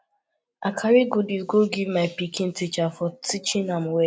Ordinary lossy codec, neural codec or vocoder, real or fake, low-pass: none; none; real; none